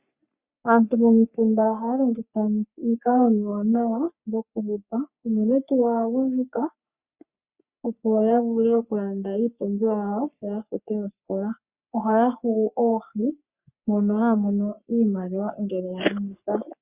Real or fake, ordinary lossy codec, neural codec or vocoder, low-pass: fake; Opus, 64 kbps; codec, 44.1 kHz, 3.4 kbps, Pupu-Codec; 3.6 kHz